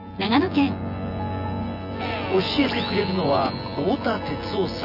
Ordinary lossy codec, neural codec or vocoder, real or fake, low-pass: none; vocoder, 24 kHz, 100 mel bands, Vocos; fake; 5.4 kHz